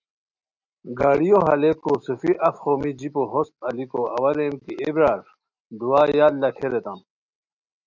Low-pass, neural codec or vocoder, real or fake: 7.2 kHz; none; real